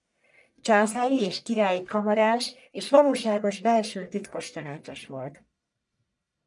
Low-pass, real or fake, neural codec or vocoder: 10.8 kHz; fake; codec, 44.1 kHz, 1.7 kbps, Pupu-Codec